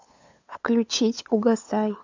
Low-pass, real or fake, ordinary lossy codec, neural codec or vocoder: 7.2 kHz; fake; AAC, 48 kbps; codec, 16 kHz, 8 kbps, FunCodec, trained on LibriTTS, 25 frames a second